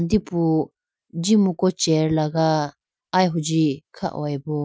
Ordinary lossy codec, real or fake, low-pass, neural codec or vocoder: none; real; none; none